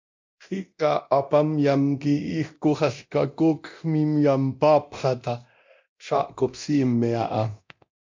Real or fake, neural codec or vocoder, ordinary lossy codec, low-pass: fake; codec, 24 kHz, 0.9 kbps, DualCodec; MP3, 64 kbps; 7.2 kHz